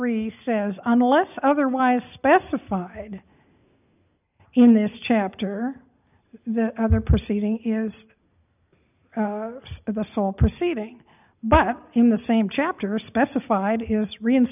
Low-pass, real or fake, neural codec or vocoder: 3.6 kHz; real; none